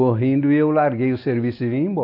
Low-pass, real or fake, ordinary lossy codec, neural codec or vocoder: 5.4 kHz; real; AAC, 32 kbps; none